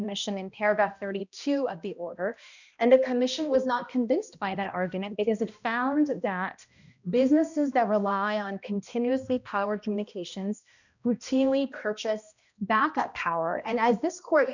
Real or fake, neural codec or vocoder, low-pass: fake; codec, 16 kHz, 1 kbps, X-Codec, HuBERT features, trained on balanced general audio; 7.2 kHz